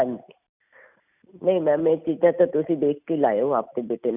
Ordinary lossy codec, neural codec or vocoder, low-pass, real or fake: none; none; 3.6 kHz; real